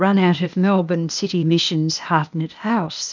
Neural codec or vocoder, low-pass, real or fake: codec, 16 kHz, 0.8 kbps, ZipCodec; 7.2 kHz; fake